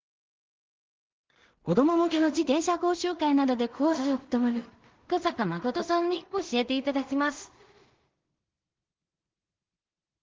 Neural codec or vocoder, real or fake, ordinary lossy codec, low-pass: codec, 16 kHz in and 24 kHz out, 0.4 kbps, LongCat-Audio-Codec, two codebook decoder; fake; Opus, 16 kbps; 7.2 kHz